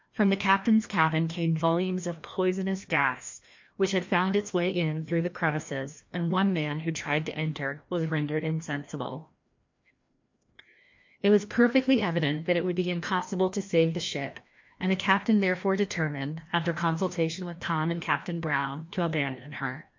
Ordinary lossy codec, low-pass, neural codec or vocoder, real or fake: MP3, 48 kbps; 7.2 kHz; codec, 16 kHz, 1 kbps, FreqCodec, larger model; fake